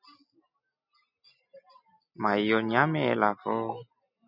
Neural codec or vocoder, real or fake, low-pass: none; real; 5.4 kHz